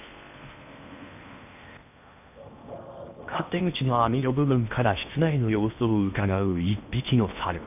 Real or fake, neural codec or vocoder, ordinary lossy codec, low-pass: fake; codec, 16 kHz in and 24 kHz out, 0.8 kbps, FocalCodec, streaming, 65536 codes; none; 3.6 kHz